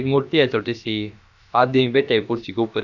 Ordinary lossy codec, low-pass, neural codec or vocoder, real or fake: none; 7.2 kHz; codec, 16 kHz, about 1 kbps, DyCAST, with the encoder's durations; fake